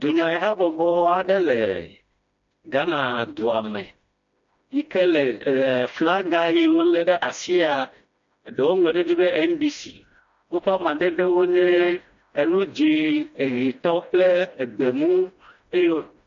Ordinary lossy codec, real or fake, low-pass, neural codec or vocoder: MP3, 48 kbps; fake; 7.2 kHz; codec, 16 kHz, 1 kbps, FreqCodec, smaller model